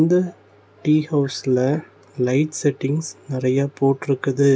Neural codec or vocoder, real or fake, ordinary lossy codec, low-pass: none; real; none; none